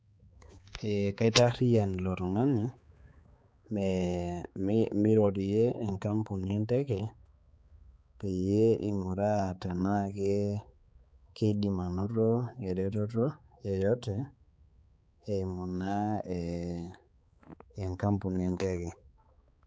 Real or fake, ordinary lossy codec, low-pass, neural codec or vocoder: fake; none; none; codec, 16 kHz, 4 kbps, X-Codec, HuBERT features, trained on balanced general audio